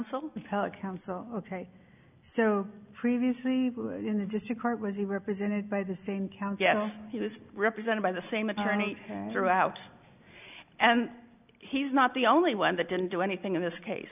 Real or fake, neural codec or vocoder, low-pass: real; none; 3.6 kHz